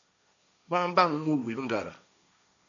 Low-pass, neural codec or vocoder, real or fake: 7.2 kHz; codec, 16 kHz, 1.1 kbps, Voila-Tokenizer; fake